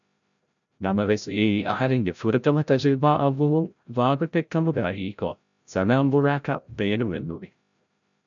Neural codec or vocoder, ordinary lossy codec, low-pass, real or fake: codec, 16 kHz, 0.5 kbps, FreqCodec, larger model; MP3, 96 kbps; 7.2 kHz; fake